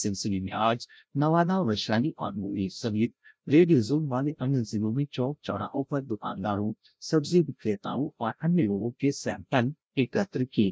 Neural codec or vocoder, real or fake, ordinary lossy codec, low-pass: codec, 16 kHz, 0.5 kbps, FreqCodec, larger model; fake; none; none